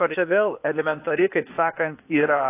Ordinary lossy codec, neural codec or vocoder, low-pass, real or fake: AAC, 16 kbps; codec, 16 kHz, 0.8 kbps, ZipCodec; 3.6 kHz; fake